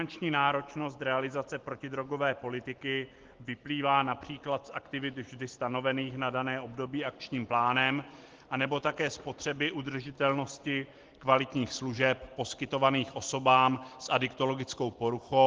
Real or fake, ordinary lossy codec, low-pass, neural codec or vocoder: real; Opus, 16 kbps; 7.2 kHz; none